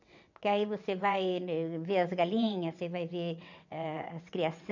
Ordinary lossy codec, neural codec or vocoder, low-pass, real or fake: none; vocoder, 22.05 kHz, 80 mel bands, WaveNeXt; 7.2 kHz; fake